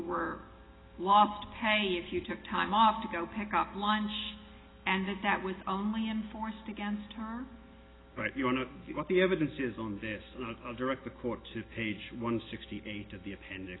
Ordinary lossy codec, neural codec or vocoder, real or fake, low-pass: AAC, 16 kbps; none; real; 7.2 kHz